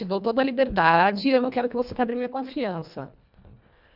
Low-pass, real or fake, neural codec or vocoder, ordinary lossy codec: 5.4 kHz; fake; codec, 24 kHz, 1.5 kbps, HILCodec; none